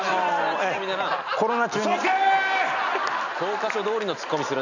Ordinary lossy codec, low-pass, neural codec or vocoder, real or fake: none; 7.2 kHz; none; real